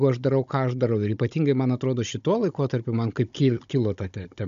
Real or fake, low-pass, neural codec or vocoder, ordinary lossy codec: fake; 7.2 kHz; codec, 16 kHz, 16 kbps, FunCodec, trained on Chinese and English, 50 frames a second; MP3, 64 kbps